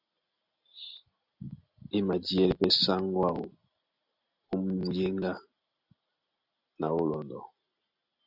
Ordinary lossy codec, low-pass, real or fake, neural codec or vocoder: Opus, 64 kbps; 5.4 kHz; real; none